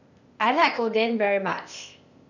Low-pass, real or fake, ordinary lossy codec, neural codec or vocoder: 7.2 kHz; fake; none; codec, 16 kHz, 0.8 kbps, ZipCodec